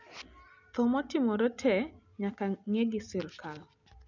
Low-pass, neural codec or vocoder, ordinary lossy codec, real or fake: 7.2 kHz; none; none; real